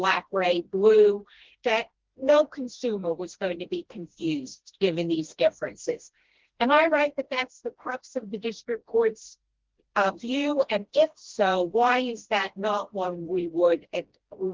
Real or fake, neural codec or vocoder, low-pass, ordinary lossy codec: fake; codec, 16 kHz, 1 kbps, FreqCodec, smaller model; 7.2 kHz; Opus, 16 kbps